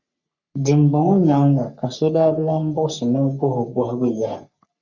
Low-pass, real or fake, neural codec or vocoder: 7.2 kHz; fake; codec, 44.1 kHz, 3.4 kbps, Pupu-Codec